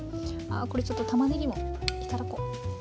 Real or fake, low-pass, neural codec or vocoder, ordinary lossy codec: real; none; none; none